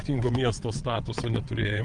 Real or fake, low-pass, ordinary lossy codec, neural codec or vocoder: fake; 9.9 kHz; Opus, 24 kbps; vocoder, 22.05 kHz, 80 mel bands, WaveNeXt